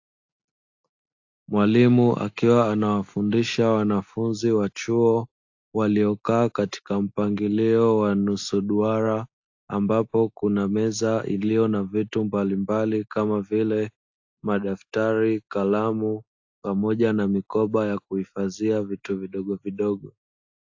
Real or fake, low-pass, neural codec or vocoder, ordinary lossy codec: real; 7.2 kHz; none; AAC, 48 kbps